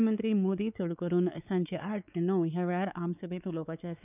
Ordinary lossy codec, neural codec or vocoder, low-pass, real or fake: none; codec, 16 kHz, 4 kbps, X-Codec, WavLM features, trained on Multilingual LibriSpeech; 3.6 kHz; fake